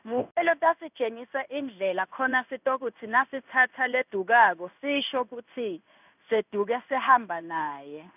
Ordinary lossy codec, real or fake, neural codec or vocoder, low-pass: none; fake; codec, 16 kHz in and 24 kHz out, 1 kbps, XY-Tokenizer; 3.6 kHz